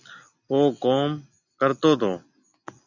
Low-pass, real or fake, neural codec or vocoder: 7.2 kHz; real; none